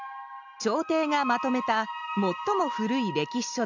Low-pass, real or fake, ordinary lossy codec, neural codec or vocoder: 7.2 kHz; real; none; none